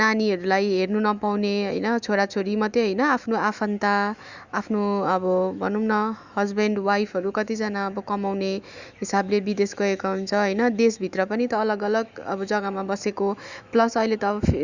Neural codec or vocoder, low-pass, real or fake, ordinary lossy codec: autoencoder, 48 kHz, 128 numbers a frame, DAC-VAE, trained on Japanese speech; 7.2 kHz; fake; Opus, 64 kbps